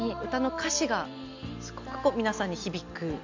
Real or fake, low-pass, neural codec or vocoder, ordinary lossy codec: real; 7.2 kHz; none; MP3, 64 kbps